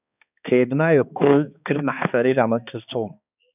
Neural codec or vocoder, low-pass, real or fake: codec, 16 kHz, 2 kbps, X-Codec, HuBERT features, trained on balanced general audio; 3.6 kHz; fake